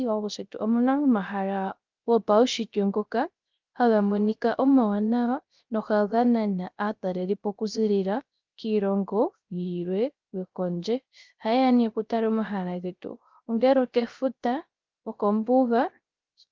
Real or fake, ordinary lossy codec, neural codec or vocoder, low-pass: fake; Opus, 32 kbps; codec, 16 kHz, 0.3 kbps, FocalCodec; 7.2 kHz